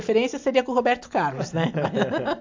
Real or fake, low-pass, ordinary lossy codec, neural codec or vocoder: real; 7.2 kHz; MP3, 64 kbps; none